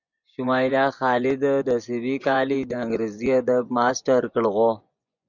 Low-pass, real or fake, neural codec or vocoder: 7.2 kHz; fake; vocoder, 24 kHz, 100 mel bands, Vocos